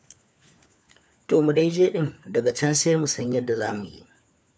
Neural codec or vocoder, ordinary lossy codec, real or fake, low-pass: codec, 16 kHz, 4 kbps, FunCodec, trained on LibriTTS, 50 frames a second; none; fake; none